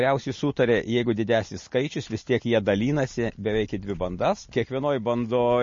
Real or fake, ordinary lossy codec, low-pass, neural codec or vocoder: real; MP3, 32 kbps; 7.2 kHz; none